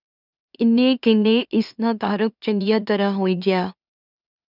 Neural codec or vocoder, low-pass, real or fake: autoencoder, 44.1 kHz, a latent of 192 numbers a frame, MeloTTS; 5.4 kHz; fake